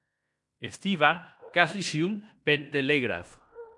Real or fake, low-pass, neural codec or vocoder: fake; 10.8 kHz; codec, 16 kHz in and 24 kHz out, 0.9 kbps, LongCat-Audio-Codec, fine tuned four codebook decoder